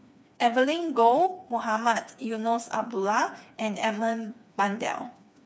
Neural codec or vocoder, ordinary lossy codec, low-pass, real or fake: codec, 16 kHz, 4 kbps, FreqCodec, smaller model; none; none; fake